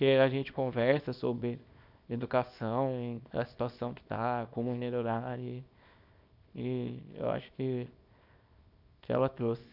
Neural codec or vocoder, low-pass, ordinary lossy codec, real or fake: codec, 24 kHz, 0.9 kbps, WavTokenizer, small release; 5.4 kHz; Opus, 64 kbps; fake